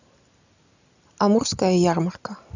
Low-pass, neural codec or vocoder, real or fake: 7.2 kHz; none; real